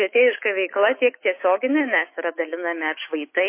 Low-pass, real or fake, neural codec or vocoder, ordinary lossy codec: 3.6 kHz; fake; autoencoder, 48 kHz, 128 numbers a frame, DAC-VAE, trained on Japanese speech; MP3, 24 kbps